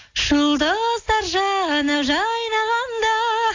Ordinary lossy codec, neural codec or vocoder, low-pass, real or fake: AAC, 48 kbps; none; 7.2 kHz; real